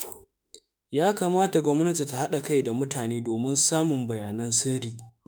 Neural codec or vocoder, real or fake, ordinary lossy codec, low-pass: autoencoder, 48 kHz, 32 numbers a frame, DAC-VAE, trained on Japanese speech; fake; none; none